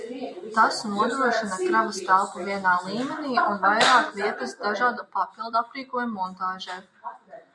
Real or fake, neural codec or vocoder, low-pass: real; none; 10.8 kHz